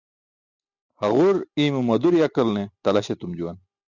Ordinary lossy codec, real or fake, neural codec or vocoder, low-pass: Opus, 64 kbps; real; none; 7.2 kHz